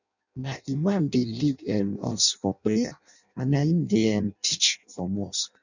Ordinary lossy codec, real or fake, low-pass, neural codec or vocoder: none; fake; 7.2 kHz; codec, 16 kHz in and 24 kHz out, 0.6 kbps, FireRedTTS-2 codec